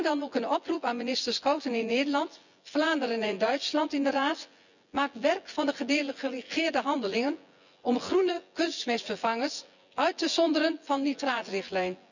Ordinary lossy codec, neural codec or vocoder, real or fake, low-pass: none; vocoder, 24 kHz, 100 mel bands, Vocos; fake; 7.2 kHz